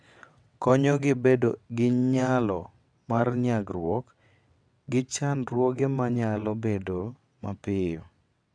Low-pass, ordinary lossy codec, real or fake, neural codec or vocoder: 9.9 kHz; none; fake; vocoder, 22.05 kHz, 80 mel bands, WaveNeXt